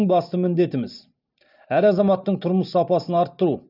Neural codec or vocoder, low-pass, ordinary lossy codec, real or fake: none; 5.4 kHz; none; real